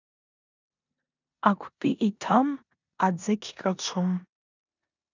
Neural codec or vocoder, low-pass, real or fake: codec, 16 kHz in and 24 kHz out, 0.9 kbps, LongCat-Audio-Codec, four codebook decoder; 7.2 kHz; fake